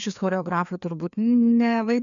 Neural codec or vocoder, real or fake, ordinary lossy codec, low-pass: codec, 16 kHz, 2 kbps, FreqCodec, larger model; fake; MP3, 96 kbps; 7.2 kHz